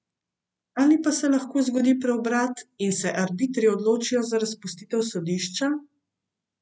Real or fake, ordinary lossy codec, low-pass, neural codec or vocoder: real; none; none; none